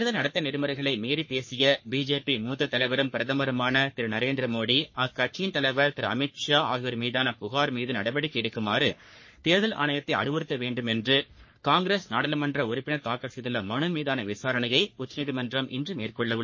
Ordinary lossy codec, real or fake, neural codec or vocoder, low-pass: MP3, 32 kbps; fake; codec, 44.1 kHz, 3.4 kbps, Pupu-Codec; 7.2 kHz